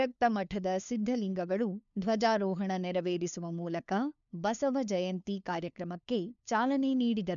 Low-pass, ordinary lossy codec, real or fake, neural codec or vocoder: 7.2 kHz; none; fake; codec, 16 kHz, 2 kbps, FunCodec, trained on LibriTTS, 25 frames a second